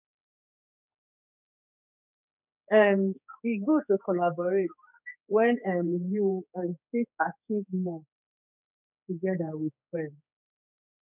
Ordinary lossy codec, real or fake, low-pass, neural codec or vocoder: none; fake; 3.6 kHz; codec, 16 kHz, 4 kbps, X-Codec, HuBERT features, trained on general audio